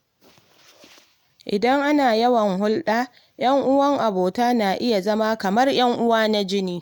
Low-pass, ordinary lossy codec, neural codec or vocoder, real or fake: none; none; none; real